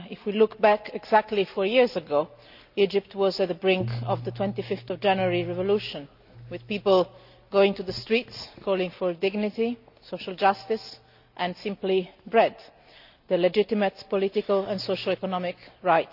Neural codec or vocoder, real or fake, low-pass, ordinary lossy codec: none; real; 5.4 kHz; none